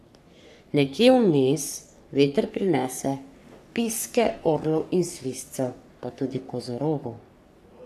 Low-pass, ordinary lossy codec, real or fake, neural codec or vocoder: 14.4 kHz; none; fake; codec, 44.1 kHz, 3.4 kbps, Pupu-Codec